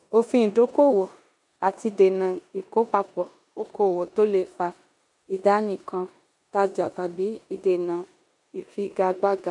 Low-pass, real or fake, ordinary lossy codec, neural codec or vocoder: 10.8 kHz; fake; none; codec, 16 kHz in and 24 kHz out, 0.9 kbps, LongCat-Audio-Codec, four codebook decoder